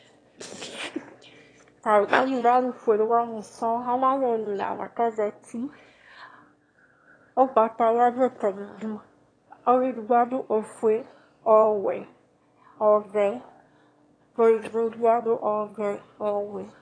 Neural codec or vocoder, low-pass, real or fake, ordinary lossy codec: autoencoder, 22.05 kHz, a latent of 192 numbers a frame, VITS, trained on one speaker; 9.9 kHz; fake; AAC, 32 kbps